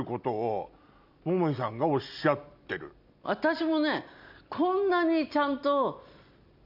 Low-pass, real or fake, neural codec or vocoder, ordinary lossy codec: 5.4 kHz; real; none; none